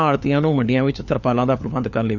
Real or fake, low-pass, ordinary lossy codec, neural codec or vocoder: fake; 7.2 kHz; none; codec, 16 kHz, 4 kbps, FunCodec, trained on LibriTTS, 50 frames a second